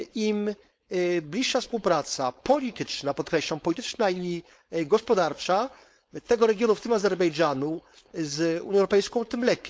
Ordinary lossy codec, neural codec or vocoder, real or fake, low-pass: none; codec, 16 kHz, 4.8 kbps, FACodec; fake; none